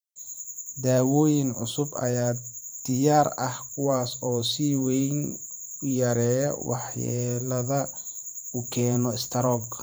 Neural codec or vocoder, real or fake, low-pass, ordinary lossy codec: vocoder, 44.1 kHz, 128 mel bands every 512 samples, BigVGAN v2; fake; none; none